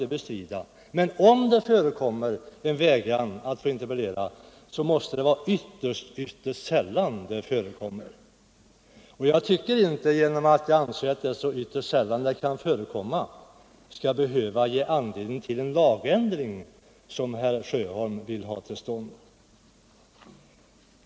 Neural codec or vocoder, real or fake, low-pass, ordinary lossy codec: none; real; none; none